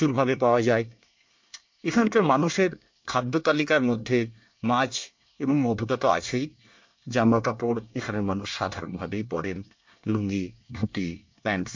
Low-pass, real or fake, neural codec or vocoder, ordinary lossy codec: 7.2 kHz; fake; codec, 24 kHz, 1 kbps, SNAC; MP3, 48 kbps